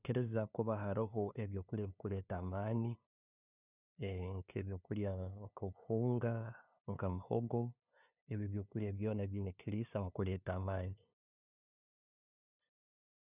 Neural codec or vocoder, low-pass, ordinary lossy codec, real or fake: codec, 16 kHz, 2 kbps, FunCodec, trained on LibriTTS, 25 frames a second; 3.6 kHz; none; fake